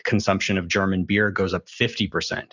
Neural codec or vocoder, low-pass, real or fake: none; 7.2 kHz; real